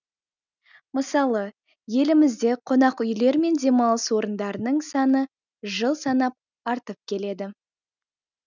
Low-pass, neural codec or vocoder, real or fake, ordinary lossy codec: 7.2 kHz; none; real; none